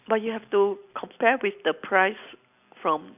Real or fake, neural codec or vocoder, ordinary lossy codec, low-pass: real; none; none; 3.6 kHz